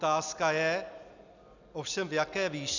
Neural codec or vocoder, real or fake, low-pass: none; real; 7.2 kHz